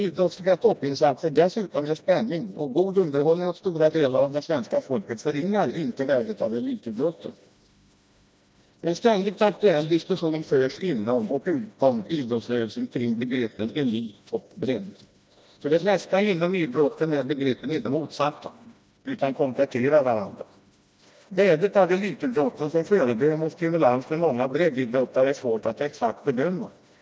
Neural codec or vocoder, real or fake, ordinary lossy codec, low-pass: codec, 16 kHz, 1 kbps, FreqCodec, smaller model; fake; none; none